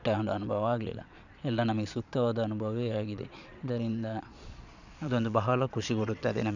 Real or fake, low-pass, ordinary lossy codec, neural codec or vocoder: fake; 7.2 kHz; none; vocoder, 22.05 kHz, 80 mel bands, Vocos